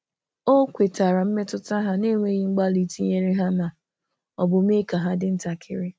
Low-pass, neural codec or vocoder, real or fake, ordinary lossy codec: none; none; real; none